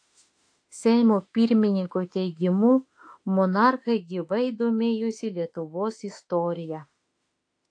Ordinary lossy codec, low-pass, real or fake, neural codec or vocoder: AAC, 48 kbps; 9.9 kHz; fake; autoencoder, 48 kHz, 32 numbers a frame, DAC-VAE, trained on Japanese speech